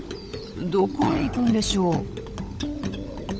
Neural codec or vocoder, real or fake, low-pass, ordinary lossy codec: codec, 16 kHz, 16 kbps, FunCodec, trained on LibriTTS, 50 frames a second; fake; none; none